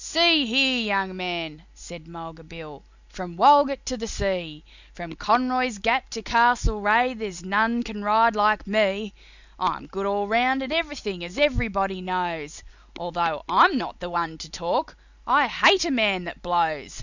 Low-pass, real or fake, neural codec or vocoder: 7.2 kHz; real; none